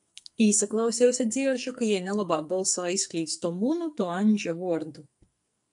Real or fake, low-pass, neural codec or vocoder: fake; 10.8 kHz; codec, 44.1 kHz, 2.6 kbps, SNAC